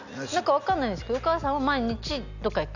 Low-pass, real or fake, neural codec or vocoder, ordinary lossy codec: 7.2 kHz; real; none; none